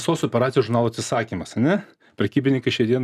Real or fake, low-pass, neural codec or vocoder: real; 14.4 kHz; none